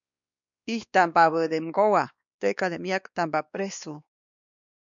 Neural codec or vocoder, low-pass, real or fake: codec, 16 kHz, 2 kbps, X-Codec, WavLM features, trained on Multilingual LibriSpeech; 7.2 kHz; fake